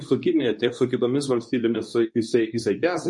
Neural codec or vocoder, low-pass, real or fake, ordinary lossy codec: codec, 24 kHz, 0.9 kbps, WavTokenizer, medium speech release version 2; 10.8 kHz; fake; MP3, 48 kbps